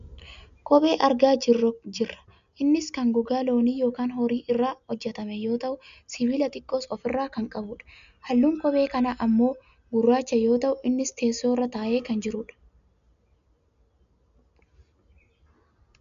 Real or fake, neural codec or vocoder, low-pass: real; none; 7.2 kHz